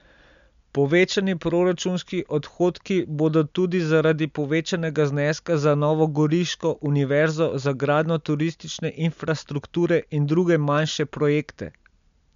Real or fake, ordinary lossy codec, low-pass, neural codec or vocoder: real; MP3, 64 kbps; 7.2 kHz; none